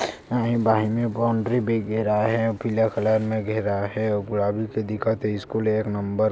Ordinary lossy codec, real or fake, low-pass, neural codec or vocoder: none; real; none; none